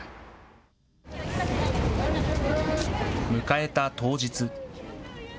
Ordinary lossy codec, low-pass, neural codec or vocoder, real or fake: none; none; none; real